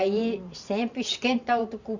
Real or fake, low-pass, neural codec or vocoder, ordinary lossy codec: fake; 7.2 kHz; vocoder, 44.1 kHz, 128 mel bands every 512 samples, BigVGAN v2; Opus, 64 kbps